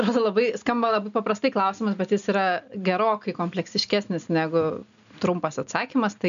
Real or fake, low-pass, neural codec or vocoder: real; 7.2 kHz; none